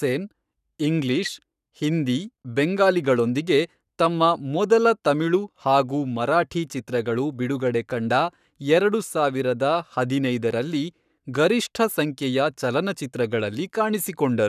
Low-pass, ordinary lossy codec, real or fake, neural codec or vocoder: 14.4 kHz; none; fake; codec, 44.1 kHz, 7.8 kbps, Pupu-Codec